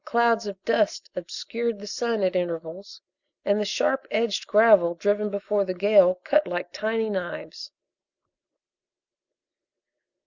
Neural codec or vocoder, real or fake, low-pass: none; real; 7.2 kHz